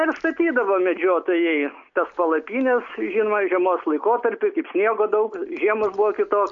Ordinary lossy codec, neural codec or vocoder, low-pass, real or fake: AAC, 64 kbps; none; 7.2 kHz; real